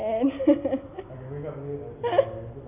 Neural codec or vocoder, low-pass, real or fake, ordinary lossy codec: none; 3.6 kHz; real; none